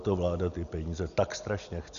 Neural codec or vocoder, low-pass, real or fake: none; 7.2 kHz; real